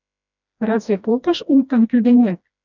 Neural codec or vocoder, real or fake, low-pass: codec, 16 kHz, 1 kbps, FreqCodec, smaller model; fake; 7.2 kHz